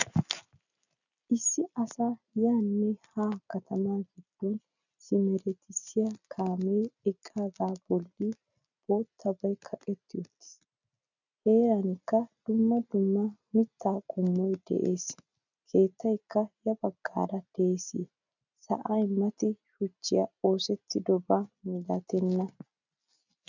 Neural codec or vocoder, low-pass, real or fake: none; 7.2 kHz; real